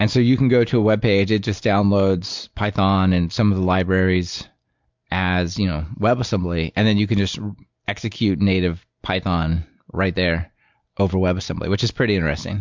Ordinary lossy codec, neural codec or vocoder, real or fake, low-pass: MP3, 64 kbps; none; real; 7.2 kHz